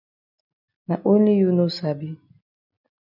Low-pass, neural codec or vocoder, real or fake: 5.4 kHz; none; real